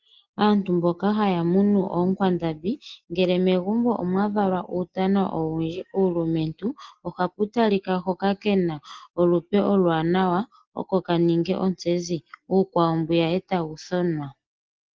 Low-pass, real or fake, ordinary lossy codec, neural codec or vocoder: 7.2 kHz; real; Opus, 16 kbps; none